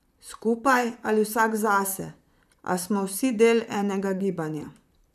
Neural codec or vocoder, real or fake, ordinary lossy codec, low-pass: vocoder, 44.1 kHz, 128 mel bands, Pupu-Vocoder; fake; none; 14.4 kHz